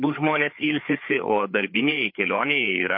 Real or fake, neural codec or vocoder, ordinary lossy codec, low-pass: fake; codec, 16 kHz, 4 kbps, FreqCodec, larger model; MP3, 32 kbps; 5.4 kHz